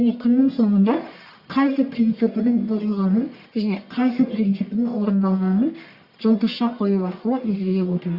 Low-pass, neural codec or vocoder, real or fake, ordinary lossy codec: 5.4 kHz; codec, 44.1 kHz, 1.7 kbps, Pupu-Codec; fake; Opus, 64 kbps